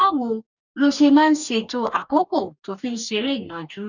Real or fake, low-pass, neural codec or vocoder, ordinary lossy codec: fake; 7.2 kHz; codec, 44.1 kHz, 2.6 kbps, DAC; none